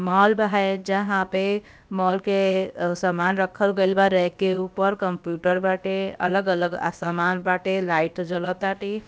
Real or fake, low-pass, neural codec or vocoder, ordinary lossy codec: fake; none; codec, 16 kHz, about 1 kbps, DyCAST, with the encoder's durations; none